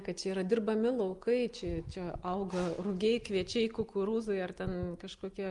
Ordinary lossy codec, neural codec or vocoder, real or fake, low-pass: Opus, 32 kbps; none; real; 10.8 kHz